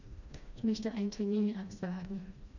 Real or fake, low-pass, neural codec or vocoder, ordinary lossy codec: fake; 7.2 kHz; codec, 16 kHz, 1 kbps, FreqCodec, smaller model; none